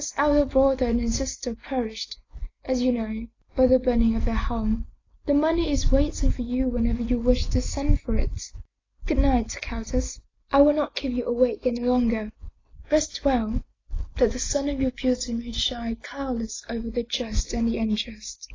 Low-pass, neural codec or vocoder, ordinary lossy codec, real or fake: 7.2 kHz; none; AAC, 32 kbps; real